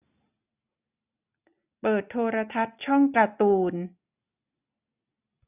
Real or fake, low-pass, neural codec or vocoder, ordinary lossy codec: real; 3.6 kHz; none; none